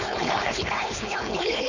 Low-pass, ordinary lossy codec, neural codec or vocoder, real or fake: 7.2 kHz; none; codec, 16 kHz, 4.8 kbps, FACodec; fake